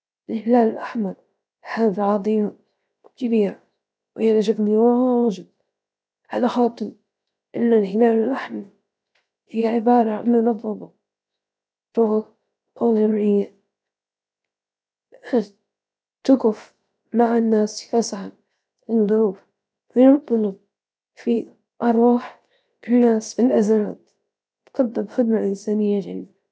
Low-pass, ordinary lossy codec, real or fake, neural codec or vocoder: none; none; fake; codec, 16 kHz, 0.7 kbps, FocalCodec